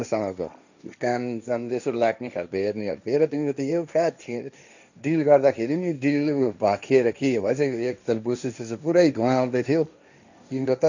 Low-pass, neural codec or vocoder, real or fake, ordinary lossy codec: 7.2 kHz; codec, 16 kHz, 1.1 kbps, Voila-Tokenizer; fake; none